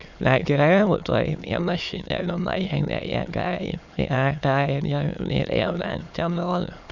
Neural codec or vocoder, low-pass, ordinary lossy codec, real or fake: autoencoder, 22.05 kHz, a latent of 192 numbers a frame, VITS, trained on many speakers; 7.2 kHz; none; fake